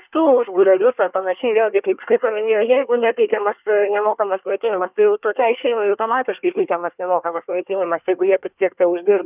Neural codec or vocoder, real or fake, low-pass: codec, 24 kHz, 1 kbps, SNAC; fake; 3.6 kHz